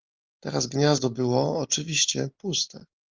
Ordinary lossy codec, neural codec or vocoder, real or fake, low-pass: Opus, 24 kbps; none; real; 7.2 kHz